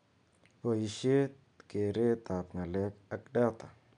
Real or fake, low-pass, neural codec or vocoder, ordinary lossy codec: real; 9.9 kHz; none; none